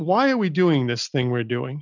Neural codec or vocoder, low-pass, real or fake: none; 7.2 kHz; real